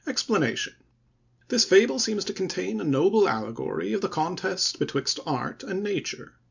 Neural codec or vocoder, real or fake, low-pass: none; real; 7.2 kHz